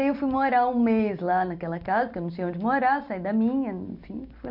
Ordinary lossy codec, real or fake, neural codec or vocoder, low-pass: none; real; none; 5.4 kHz